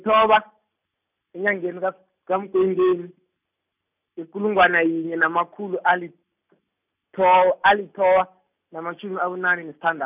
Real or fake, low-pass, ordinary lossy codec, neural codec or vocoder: real; 3.6 kHz; none; none